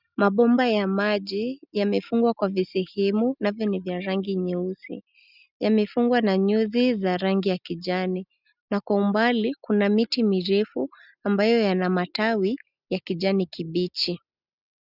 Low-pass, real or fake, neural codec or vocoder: 5.4 kHz; real; none